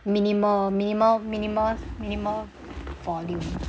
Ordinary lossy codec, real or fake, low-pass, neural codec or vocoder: none; real; none; none